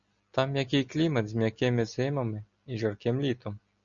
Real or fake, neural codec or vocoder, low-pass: real; none; 7.2 kHz